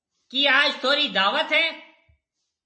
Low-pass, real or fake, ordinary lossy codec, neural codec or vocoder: 9.9 kHz; real; MP3, 32 kbps; none